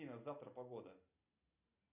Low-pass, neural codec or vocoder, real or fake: 3.6 kHz; none; real